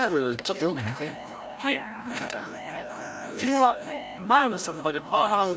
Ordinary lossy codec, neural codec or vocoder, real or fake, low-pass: none; codec, 16 kHz, 0.5 kbps, FreqCodec, larger model; fake; none